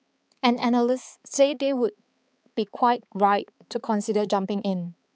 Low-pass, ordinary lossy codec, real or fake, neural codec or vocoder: none; none; fake; codec, 16 kHz, 4 kbps, X-Codec, HuBERT features, trained on balanced general audio